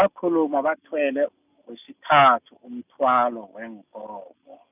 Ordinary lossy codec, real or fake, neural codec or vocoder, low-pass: none; real; none; 3.6 kHz